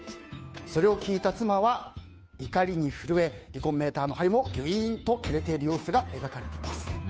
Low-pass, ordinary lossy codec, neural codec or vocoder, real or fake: none; none; codec, 16 kHz, 2 kbps, FunCodec, trained on Chinese and English, 25 frames a second; fake